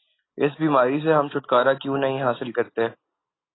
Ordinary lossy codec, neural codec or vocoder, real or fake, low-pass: AAC, 16 kbps; none; real; 7.2 kHz